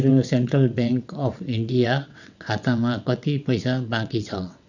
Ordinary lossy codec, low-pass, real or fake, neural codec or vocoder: none; 7.2 kHz; fake; vocoder, 22.05 kHz, 80 mel bands, WaveNeXt